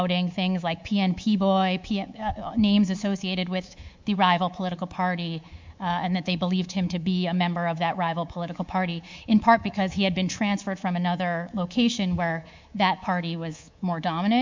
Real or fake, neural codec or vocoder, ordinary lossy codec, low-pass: fake; codec, 24 kHz, 3.1 kbps, DualCodec; MP3, 64 kbps; 7.2 kHz